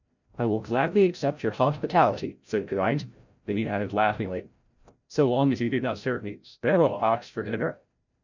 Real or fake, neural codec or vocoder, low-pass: fake; codec, 16 kHz, 0.5 kbps, FreqCodec, larger model; 7.2 kHz